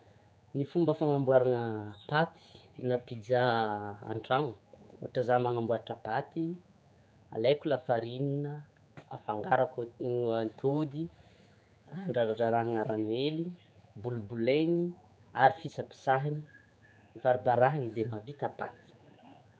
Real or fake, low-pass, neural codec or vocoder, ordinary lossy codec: fake; none; codec, 16 kHz, 4 kbps, X-Codec, HuBERT features, trained on general audio; none